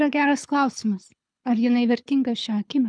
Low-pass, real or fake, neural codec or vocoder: 9.9 kHz; fake; codec, 24 kHz, 6 kbps, HILCodec